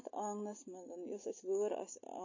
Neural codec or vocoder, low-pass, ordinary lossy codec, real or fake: none; 7.2 kHz; MP3, 32 kbps; real